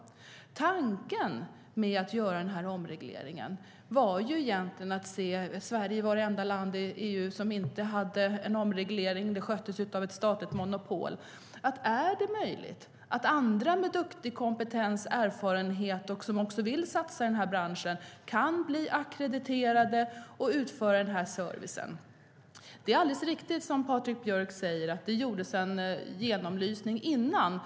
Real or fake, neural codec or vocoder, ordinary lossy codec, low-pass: real; none; none; none